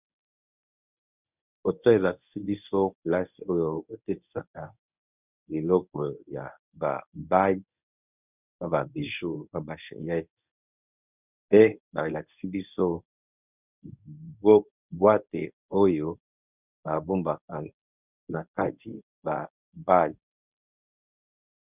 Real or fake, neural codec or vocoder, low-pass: fake; codec, 24 kHz, 0.9 kbps, WavTokenizer, medium speech release version 1; 3.6 kHz